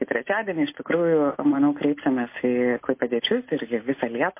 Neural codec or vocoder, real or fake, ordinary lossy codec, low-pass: none; real; MP3, 24 kbps; 3.6 kHz